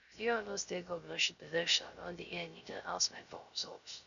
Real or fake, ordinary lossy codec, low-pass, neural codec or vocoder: fake; none; 7.2 kHz; codec, 16 kHz, 0.3 kbps, FocalCodec